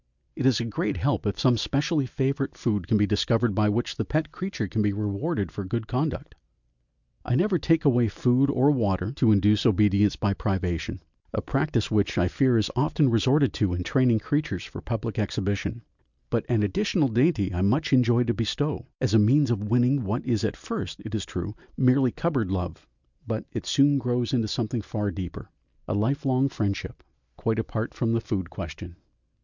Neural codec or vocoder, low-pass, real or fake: none; 7.2 kHz; real